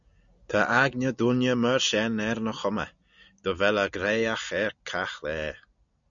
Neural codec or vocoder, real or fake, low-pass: none; real; 7.2 kHz